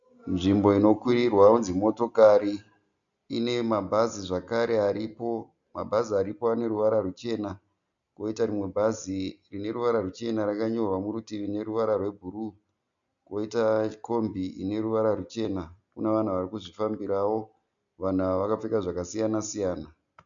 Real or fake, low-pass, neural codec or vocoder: real; 7.2 kHz; none